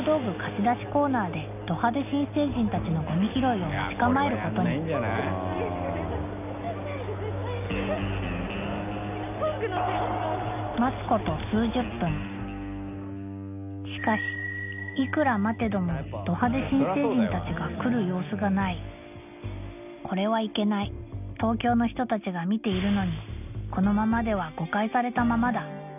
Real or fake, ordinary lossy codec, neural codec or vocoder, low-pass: real; none; none; 3.6 kHz